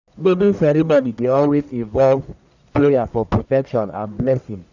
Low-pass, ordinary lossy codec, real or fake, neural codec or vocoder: 7.2 kHz; none; fake; codec, 44.1 kHz, 1.7 kbps, Pupu-Codec